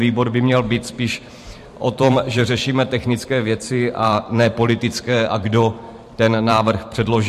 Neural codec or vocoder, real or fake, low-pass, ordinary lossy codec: vocoder, 44.1 kHz, 128 mel bands every 512 samples, BigVGAN v2; fake; 14.4 kHz; MP3, 64 kbps